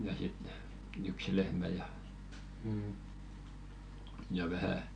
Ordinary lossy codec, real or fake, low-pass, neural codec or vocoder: none; real; 9.9 kHz; none